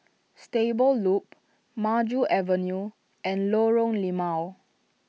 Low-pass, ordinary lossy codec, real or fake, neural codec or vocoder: none; none; real; none